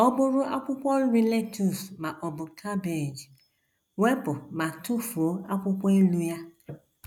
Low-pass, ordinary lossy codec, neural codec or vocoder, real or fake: 19.8 kHz; none; none; real